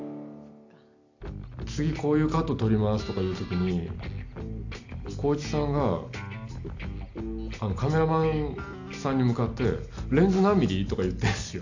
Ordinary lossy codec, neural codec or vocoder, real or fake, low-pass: AAC, 48 kbps; none; real; 7.2 kHz